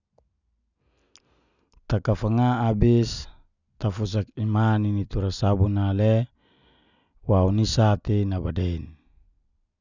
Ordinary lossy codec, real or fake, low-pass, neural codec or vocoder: none; real; 7.2 kHz; none